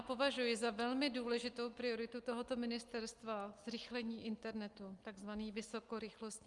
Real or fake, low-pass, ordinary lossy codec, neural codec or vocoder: real; 10.8 kHz; Opus, 32 kbps; none